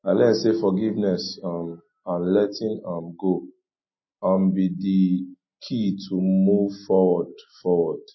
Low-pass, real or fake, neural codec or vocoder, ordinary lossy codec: 7.2 kHz; real; none; MP3, 24 kbps